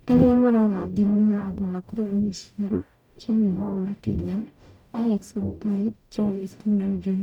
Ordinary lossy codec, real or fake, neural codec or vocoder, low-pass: none; fake; codec, 44.1 kHz, 0.9 kbps, DAC; 19.8 kHz